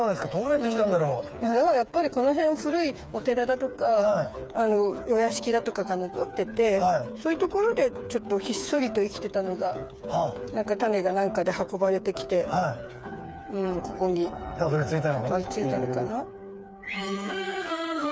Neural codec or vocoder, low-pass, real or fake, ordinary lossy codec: codec, 16 kHz, 4 kbps, FreqCodec, smaller model; none; fake; none